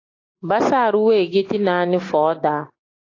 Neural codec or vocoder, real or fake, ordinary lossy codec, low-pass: none; real; AAC, 32 kbps; 7.2 kHz